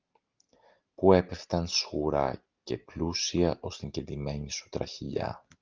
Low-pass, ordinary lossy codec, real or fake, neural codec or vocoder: 7.2 kHz; Opus, 24 kbps; real; none